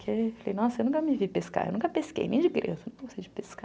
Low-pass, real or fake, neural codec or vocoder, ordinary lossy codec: none; real; none; none